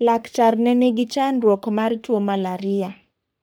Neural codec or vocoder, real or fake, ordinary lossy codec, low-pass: codec, 44.1 kHz, 3.4 kbps, Pupu-Codec; fake; none; none